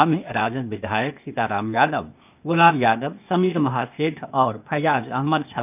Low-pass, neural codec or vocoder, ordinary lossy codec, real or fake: 3.6 kHz; codec, 16 kHz, 0.8 kbps, ZipCodec; none; fake